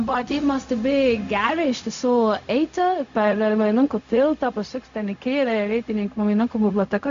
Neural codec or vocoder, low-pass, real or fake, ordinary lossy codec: codec, 16 kHz, 0.4 kbps, LongCat-Audio-Codec; 7.2 kHz; fake; MP3, 64 kbps